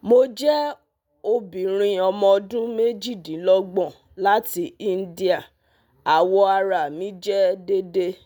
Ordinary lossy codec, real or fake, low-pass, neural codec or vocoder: none; real; none; none